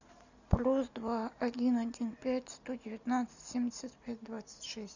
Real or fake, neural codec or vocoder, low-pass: real; none; 7.2 kHz